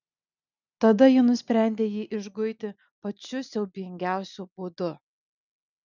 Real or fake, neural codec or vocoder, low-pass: real; none; 7.2 kHz